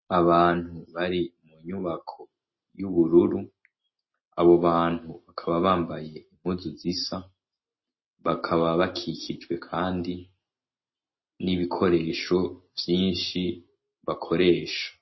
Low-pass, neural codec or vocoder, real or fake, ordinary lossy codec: 7.2 kHz; none; real; MP3, 24 kbps